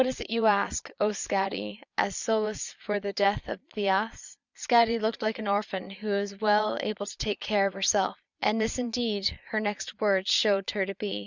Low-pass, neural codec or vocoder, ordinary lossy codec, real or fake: 7.2 kHz; vocoder, 22.05 kHz, 80 mel bands, Vocos; Opus, 64 kbps; fake